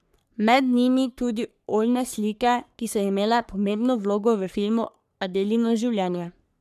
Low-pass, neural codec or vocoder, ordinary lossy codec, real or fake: 14.4 kHz; codec, 44.1 kHz, 3.4 kbps, Pupu-Codec; none; fake